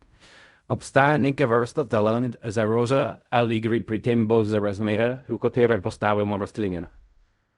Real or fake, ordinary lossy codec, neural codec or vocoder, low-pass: fake; Opus, 64 kbps; codec, 16 kHz in and 24 kHz out, 0.4 kbps, LongCat-Audio-Codec, fine tuned four codebook decoder; 10.8 kHz